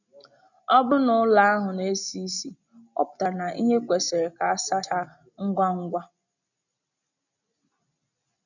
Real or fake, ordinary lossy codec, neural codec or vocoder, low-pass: real; none; none; 7.2 kHz